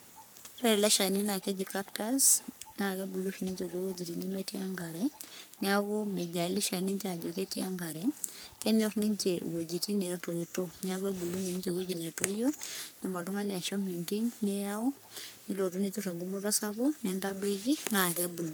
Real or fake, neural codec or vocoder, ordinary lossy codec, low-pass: fake; codec, 44.1 kHz, 3.4 kbps, Pupu-Codec; none; none